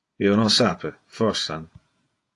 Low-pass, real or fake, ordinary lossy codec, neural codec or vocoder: 10.8 kHz; fake; AAC, 48 kbps; vocoder, 48 kHz, 128 mel bands, Vocos